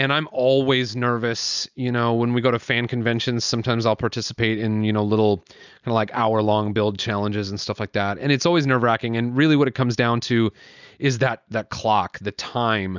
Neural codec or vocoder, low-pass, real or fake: none; 7.2 kHz; real